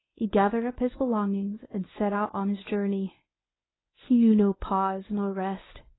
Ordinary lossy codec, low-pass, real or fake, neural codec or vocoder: AAC, 16 kbps; 7.2 kHz; fake; codec, 24 kHz, 0.9 kbps, WavTokenizer, medium speech release version 1